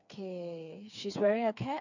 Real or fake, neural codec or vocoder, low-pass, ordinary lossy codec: fake; codec, 16 kHz, 4 kbps, FreqCodec, smaller model; 7.2 kHz; none